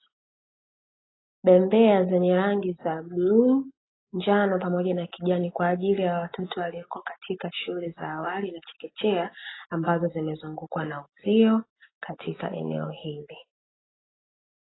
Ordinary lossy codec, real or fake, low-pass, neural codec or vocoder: AAC, 16 kbps; real; 7.2 kHz; none